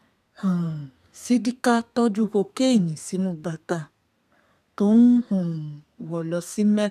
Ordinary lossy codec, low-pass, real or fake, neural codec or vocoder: none; 14.4 kHz; fake; codec, 32 kHz, 1.9 kbps, SNAC